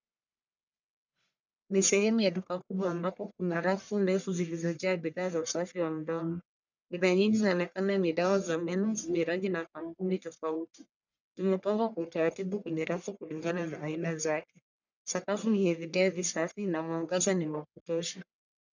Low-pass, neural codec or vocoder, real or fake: 7.2 kHz; codec, 44.1 kHz, 1.7 kbps, Pupu-Codec; fake